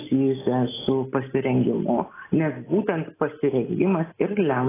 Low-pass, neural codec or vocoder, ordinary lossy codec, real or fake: 3.6 kHz; none; AAC, 16 kbps; real